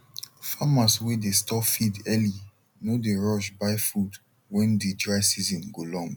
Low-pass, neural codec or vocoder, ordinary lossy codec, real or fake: none; none; none; real